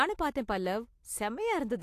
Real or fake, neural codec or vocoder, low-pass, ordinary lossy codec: real; none; 14.4 kHz; AAC, 64 kbps